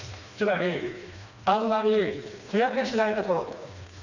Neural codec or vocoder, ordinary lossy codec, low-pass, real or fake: codec, 16 kHz, 2 kbps, FreqCodec, smaller model; none; 7.2 kHz; fake